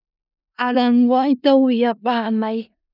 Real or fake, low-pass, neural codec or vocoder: fake; 5.4 kHz; codec, 16 kHz in and 24 kHz out, 0.4 kbps, LongCat-Audio-Codec, four codebook decoder